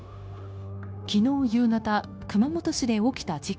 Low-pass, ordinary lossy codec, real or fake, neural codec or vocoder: none; none; fake; codec, 16 kHz, 0.9 kbps, LongCat-Audio-Codec